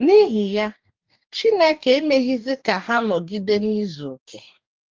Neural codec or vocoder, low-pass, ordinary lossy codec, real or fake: codec, 44.1 kHz, 2.6 kbps, DAC; 7.2 kHz; Opus, 32 kbps; fake